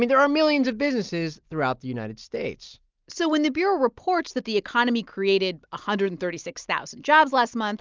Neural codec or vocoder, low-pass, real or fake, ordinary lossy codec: none; 7.2 kHz; real; Opus, 24 kbps